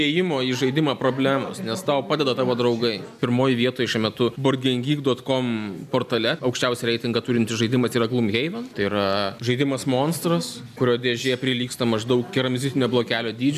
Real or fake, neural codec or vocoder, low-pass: real; none; 14.4 kHz